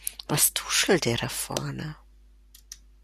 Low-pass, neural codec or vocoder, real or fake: 14.4 kHz; none; real